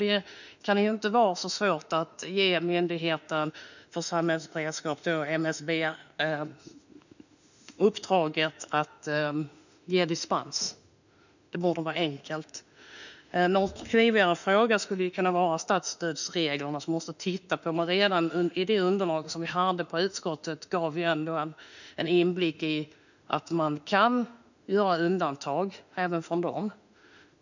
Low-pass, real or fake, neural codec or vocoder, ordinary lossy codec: 7.2 kHz; fake; autoencoder, 48 kHz, 32 numbers a frame, DAC-VAE, trained on Japanese speech; none